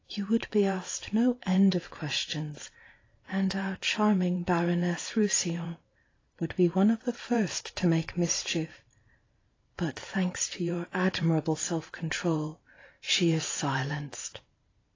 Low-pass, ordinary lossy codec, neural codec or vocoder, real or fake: 7.2 kHz; AAC, 32 kbps; vocoder, 44.1 kHz, 128 mel bands every 512 samples, BigVGAN v2; fake